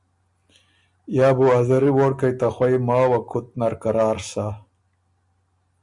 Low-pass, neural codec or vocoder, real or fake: 10.8 kHz; none; real